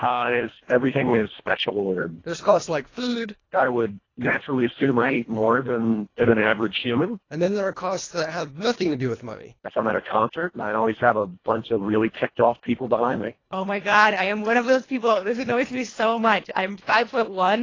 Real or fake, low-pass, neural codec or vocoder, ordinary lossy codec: fake; 7.2 kHz; codec, 24 kHz, 1.5 kbps, HILCodec; AAC, 32 kbps